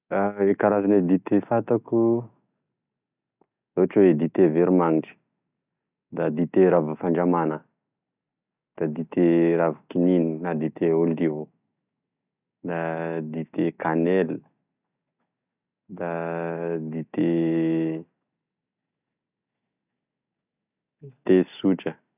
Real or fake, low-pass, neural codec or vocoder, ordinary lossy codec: real; 3.6 kHz; none; none